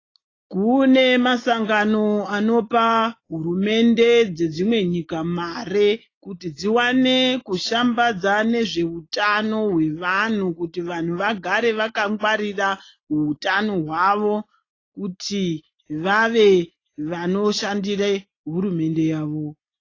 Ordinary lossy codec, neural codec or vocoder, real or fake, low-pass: AAC, 32 kbps; none; real; 7.2 kHz